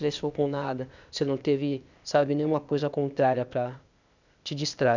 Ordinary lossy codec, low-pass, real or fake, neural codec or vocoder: none; 7.2 kHz; fake; codec, 16 kHz, 0.8 kbps, ZipCodec